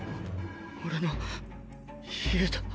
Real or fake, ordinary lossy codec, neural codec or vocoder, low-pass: real; none; none; none